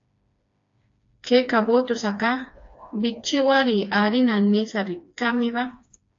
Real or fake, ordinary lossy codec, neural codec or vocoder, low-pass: fake; AAC, 48 kbps; codec, 16 kHz, 2 kbps, FreqCodec, smaller model; 7.2 kHz